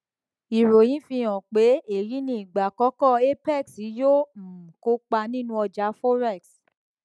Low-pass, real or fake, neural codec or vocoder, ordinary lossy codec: none; real; none; none